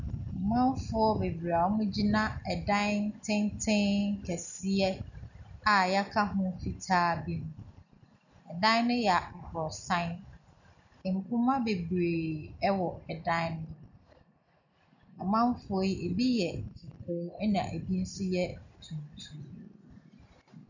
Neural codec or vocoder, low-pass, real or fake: none; 7.2 kHz; real